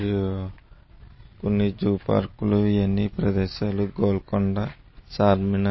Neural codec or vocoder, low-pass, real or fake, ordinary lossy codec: none; 7.2 kHz; real; MP3, 24 kbps